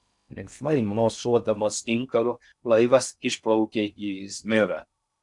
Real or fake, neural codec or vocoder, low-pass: fake; codec, 16 kHz in and 24 kHz out, 0.6 kbps, FocalCodec, streaming, 2048 codes; 10.8 kHz